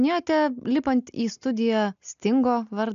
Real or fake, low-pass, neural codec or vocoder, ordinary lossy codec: real; 7.2 kHz; none; AAC, 96 kbps